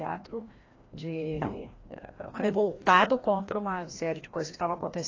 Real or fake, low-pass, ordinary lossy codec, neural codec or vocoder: fake; 7.2 kHz; AAC, 32 kbps; codec, 16 kHz, 1 kbps, FreqCodec, larger model